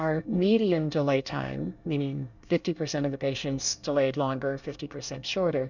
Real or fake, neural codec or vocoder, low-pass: fake; codec, 24 kHz, 1 kbps, SNAC; 7.2 kHz